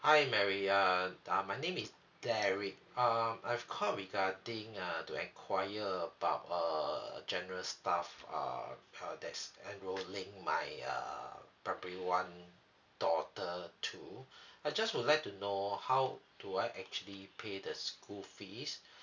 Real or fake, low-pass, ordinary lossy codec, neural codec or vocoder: real; 7.2 kHz; none; none